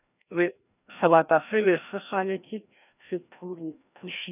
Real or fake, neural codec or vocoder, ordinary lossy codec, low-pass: fake; codec, 16 kHz, 1 kbps, FreqCodec, larger model; none; 3.6 kHz